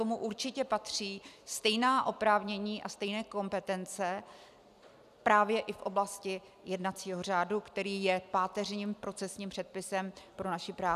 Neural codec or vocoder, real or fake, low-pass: none; real; 14.4 kHz